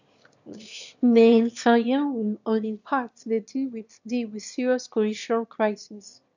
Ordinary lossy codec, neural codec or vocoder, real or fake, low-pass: none; autoencoder, 22.05 kHz, a latent of 192 numbers a frame, VITS, trained on one speaker; fake; 7.2 kHz